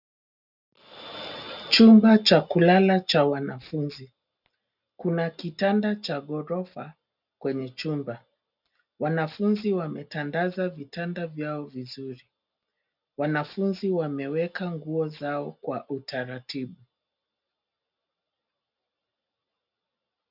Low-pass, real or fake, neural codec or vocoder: 5.4 kHz; real; none